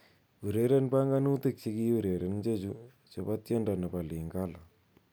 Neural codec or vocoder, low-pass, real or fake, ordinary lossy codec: none; none; real; none